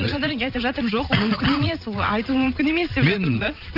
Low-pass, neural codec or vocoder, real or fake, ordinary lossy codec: 5.4 kHz; vocoder, 22.05 kHz, 80 mel bands, WaveNeXt; fake; none